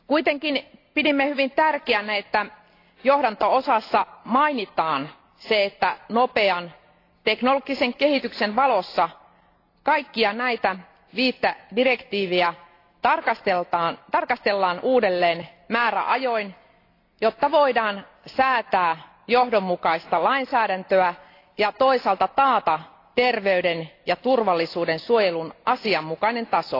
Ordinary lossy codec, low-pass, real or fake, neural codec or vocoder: AAC, 32 kbps; 5.4 kHz; real; none